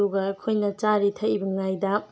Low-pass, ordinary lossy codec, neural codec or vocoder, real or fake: none; none; none; real